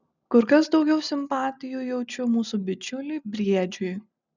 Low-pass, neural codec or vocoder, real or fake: 7.2 kHz; none; real